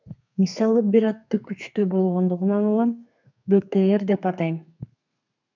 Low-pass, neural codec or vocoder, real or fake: 7.2 kHz; codec, 32 kHz, 1.9 kbps, SNAC; fake